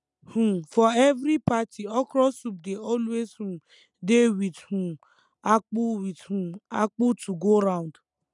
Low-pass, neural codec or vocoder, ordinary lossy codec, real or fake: 10.8 kHz; none; none; real